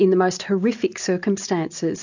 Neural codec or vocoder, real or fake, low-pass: none; real; 7.2 kHz